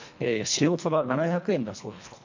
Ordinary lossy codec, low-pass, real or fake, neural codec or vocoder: MP3, 64 kbps; 7.2 kHz; fake; codec, 24 kHz, 1.5 kbps, HILCodec